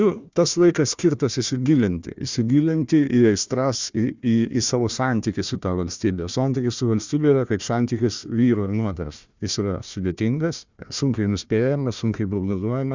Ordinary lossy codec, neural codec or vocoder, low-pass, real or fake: Opus, 64 kbps; codec, 16 kHz, 1 kbps, FunCodec, trained on Chinese and English, 50 frames a second; 7.2 kHz; fake